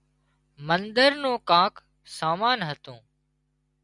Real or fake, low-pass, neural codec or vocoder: real; 10.8 kHz; none